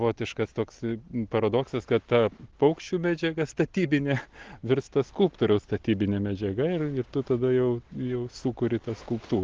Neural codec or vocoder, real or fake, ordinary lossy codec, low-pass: none; real; Opus, 16 kbps; 7.2 kHz